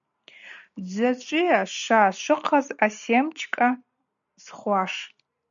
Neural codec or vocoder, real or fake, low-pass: none; real; 7.2 kHz